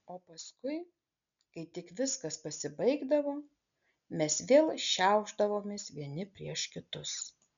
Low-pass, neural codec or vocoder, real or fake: 7.2 kHz; none; real